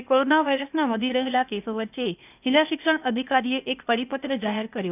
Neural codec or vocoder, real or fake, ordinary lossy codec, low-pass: codec, 16 kHz, 0.8 kbps, ZipCodec; fake; none; 3.6 kHz